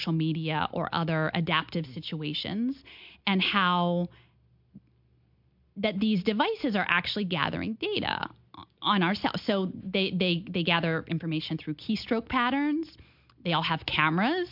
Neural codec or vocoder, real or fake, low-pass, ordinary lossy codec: none; real; 5.4 kHz; MP3, 48 kbps